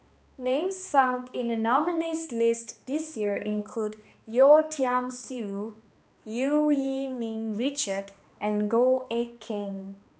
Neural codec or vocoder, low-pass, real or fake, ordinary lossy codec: codec, 16 kHz, 2 kbps, X-Codec, HuBERT features, trained on balanced general audio; none; fake; none